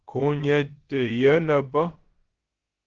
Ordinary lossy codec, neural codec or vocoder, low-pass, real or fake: Opus, 16 kbps; codec, 16 kHz, about 1 kbps, DyCAST, with the encoder's durations; 7.2 kHz; fake